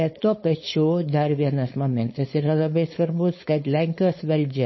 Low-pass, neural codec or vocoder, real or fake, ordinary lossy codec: 7.2 kHz; codec, 16 kHz, 4.8 kbps, FACodec; fake; MP3, 24 kbps